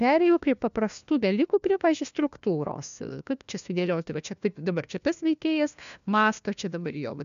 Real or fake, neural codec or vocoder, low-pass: fake; codec, 16 kHz, 1 kbps, FunCodec, trained on LibriTTS, 50 frames a second; 7.2 kHz